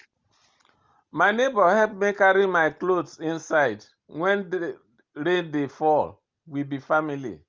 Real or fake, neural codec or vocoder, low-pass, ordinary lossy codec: real; none; 7.2 kHz; Opus, 32 kbps